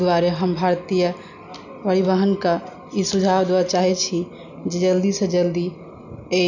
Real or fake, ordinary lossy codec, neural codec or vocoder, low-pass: real; none; none; 7.2 kHz